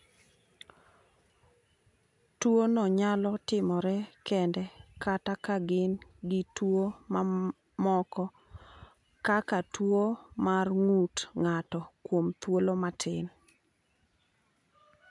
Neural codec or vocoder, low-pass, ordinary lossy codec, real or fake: none; 10.8 kHz; none; real